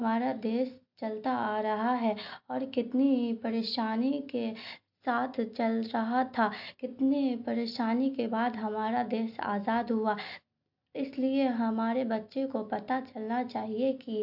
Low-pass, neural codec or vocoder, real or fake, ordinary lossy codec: 5.4 kHz; none; real; AAC, 48 kbps